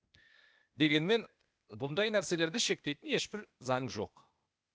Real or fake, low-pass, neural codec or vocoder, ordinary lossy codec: fake; none; codec, 16 kHz, 0.8 kbps, ZipCodec; none